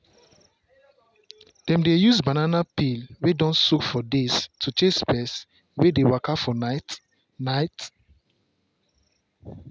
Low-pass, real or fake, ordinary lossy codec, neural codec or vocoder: none; real; none; none